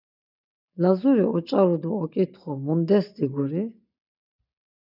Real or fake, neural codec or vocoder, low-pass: real; none; 5.4 kHz